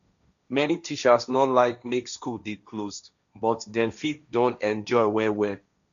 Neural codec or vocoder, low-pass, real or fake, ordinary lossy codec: codec, 16 kHz, 1.1 kbps, Voila-Tokenizer; 7.2 kHz; fake; none